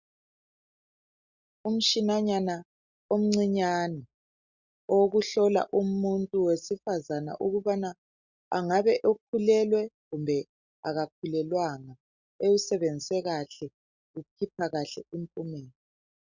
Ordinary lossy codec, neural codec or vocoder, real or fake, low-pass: Opus, 64 kbps; none; real; 7.2 kHz